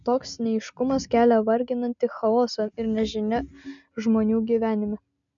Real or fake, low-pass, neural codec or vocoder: real; 7.2 kHz; none